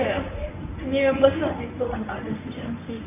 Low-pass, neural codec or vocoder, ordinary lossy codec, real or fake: 3.6 kHz; codec, 24 kHz, 0.9 kbps, WavTokenizer, medium speech release version 1; none; fake